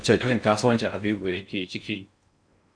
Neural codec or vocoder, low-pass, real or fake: codec, 16 kHz in and 24 kHz out, 0.6 kbps, FocalCodec, streaming, 4096 codes; 9.9 kHz; fake